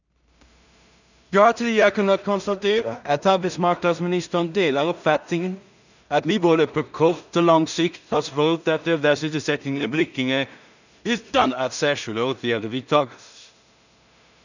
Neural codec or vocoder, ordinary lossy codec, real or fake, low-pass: codec, 16 kHz in and 24 kHz out, 0.4 kbps, LongCat-Audio-Codec, two codebook decoder; none; fake; 7.2 kHz